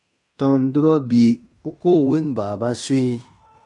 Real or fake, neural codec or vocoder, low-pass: fake; codec, 16 kHz in and 24 kHz out, 0.9 kbps, LongCat-Audio-Codec, fine tuned four codebook decoder; 10.8 kHz